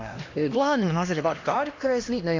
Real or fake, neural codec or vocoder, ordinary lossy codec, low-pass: fake; codec, 16 kHz, 1 kbps, X-Codec, HuBERT features, trained on LibriSpeech; AAC, 48 kbps; 7.2 kHz